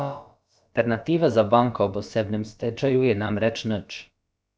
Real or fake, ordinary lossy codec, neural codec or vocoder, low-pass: fake; none; codec, 16 kHz, about 1 kbps, DyCAST, with the encoder's durations; none